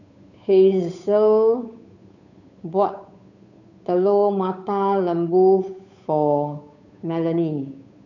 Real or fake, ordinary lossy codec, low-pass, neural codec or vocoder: fake; none; 7.2 kHz; codec, 16 kHz, 8 kbps, FunCodec, trained on Chinese and English, 25 frames a second